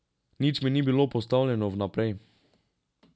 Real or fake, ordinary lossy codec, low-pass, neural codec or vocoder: real; none; none; none